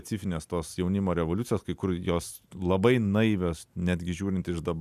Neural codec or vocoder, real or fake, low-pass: none; real; 14.4 kHz